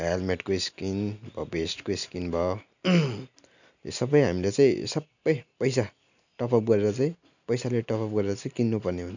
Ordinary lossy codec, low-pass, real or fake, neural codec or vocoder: none; 7.2 kHz; real; none